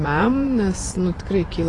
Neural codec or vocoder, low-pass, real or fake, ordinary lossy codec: vocoder, 24 kHz, 100 mel bands, Vocos; 10.8 kHz; fake; AAC, 32 kbps